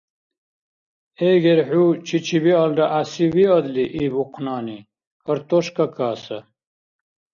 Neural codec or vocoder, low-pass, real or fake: none; 7.2 kHz; real